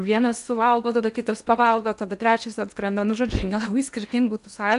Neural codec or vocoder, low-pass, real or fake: codec, 16 kHz in and 24 kHz out, 0.8 kbps, FocalCodec, streaming, 65536 codes; 10.8 kHz; fake